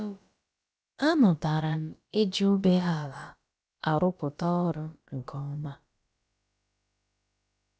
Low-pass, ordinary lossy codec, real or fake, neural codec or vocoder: none; none; fake; codec, 16 kHz, about 1 kbps, DyCAST, with the encoder's durations